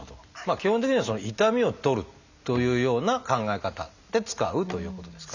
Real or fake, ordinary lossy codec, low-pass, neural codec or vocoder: real; none; 7.2 kHz; none